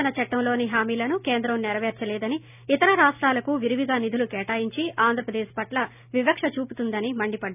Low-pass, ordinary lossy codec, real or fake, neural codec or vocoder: 3.6 kHz; none; real; none